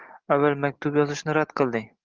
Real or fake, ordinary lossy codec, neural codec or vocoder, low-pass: real; Opus, 16 kbps; none; 7.2 kHz